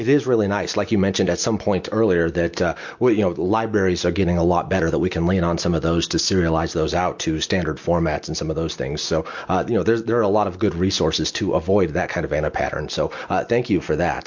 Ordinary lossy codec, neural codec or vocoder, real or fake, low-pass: MP3, 48 kbps; none; real; 7.2 kHz